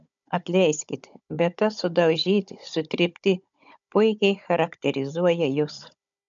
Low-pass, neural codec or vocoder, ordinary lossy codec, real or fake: 7.2 kHz; codec, 16 kHz, 16 kbps, FunCodec, trained on Chinese and English, 50 frames a second; MP3, 96 kbps; fake